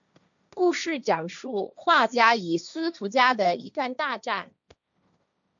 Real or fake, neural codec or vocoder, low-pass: fake; codec, 16 kHz, 1.1 kbps, Voila-Tokenizer; 7.2 kHz